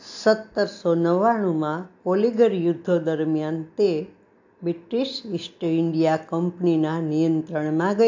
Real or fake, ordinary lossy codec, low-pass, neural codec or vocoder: real; AAC, 48 kbps; 7.2 kHz; none